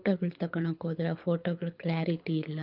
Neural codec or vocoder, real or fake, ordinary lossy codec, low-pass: codec, 24 kHz, 6 kbps, HILCodec; fake; Opus, 24 kbps; 5.4 kHz